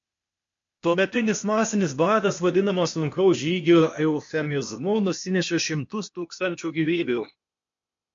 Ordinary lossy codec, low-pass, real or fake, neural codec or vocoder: MP3, 48 kbps; 7.2 kHz; fake; codec, 16 kHz, 0.8 kbps, ZipCodec